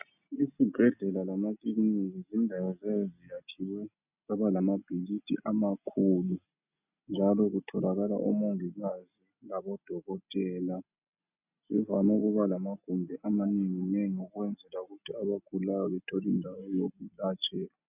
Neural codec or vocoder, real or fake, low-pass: none; real; 3.6 kHz